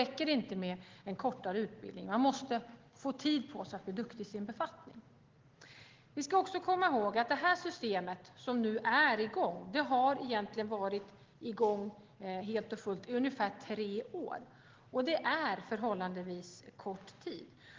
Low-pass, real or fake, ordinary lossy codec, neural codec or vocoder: 7.2 kHz; real; Opus, 16 kbps; none